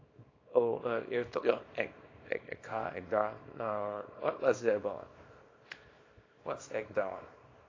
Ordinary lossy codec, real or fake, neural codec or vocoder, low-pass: AAC, 32 kbps; fake; codec, 24 kHz, 0.9 kbps, WavTokenizer, small release; 7.2 kHz